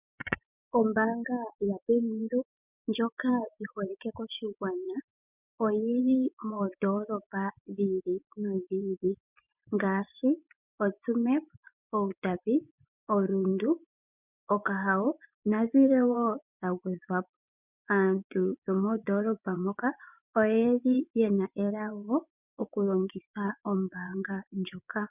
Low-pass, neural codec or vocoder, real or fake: 3.6 kHz; vocoder, 24 kHz, 100 mel bands, Vocos; fake